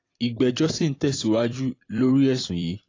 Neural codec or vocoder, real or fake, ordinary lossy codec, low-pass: vocoder, 44.1 kHz, 128 mel bands every 256 samples, BigVGAN v2; fake; AAC, 32 kbps; 7.2 kHz